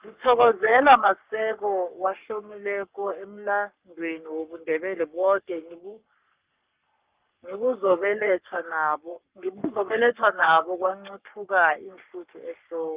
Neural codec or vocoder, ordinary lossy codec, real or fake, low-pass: codec, 44.1 kHz, 3.4 kbps, Pupu-Codec; Opus, 32 kbps; fake; 3.6 kHz